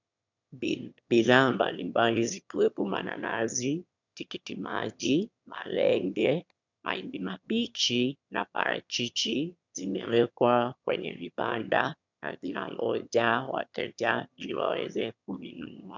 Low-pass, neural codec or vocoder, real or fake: 7.2 kHz; autoencoder, 22.05 kHz, a latent of 192 numbers a frame, VITS, trained on one speaker; fake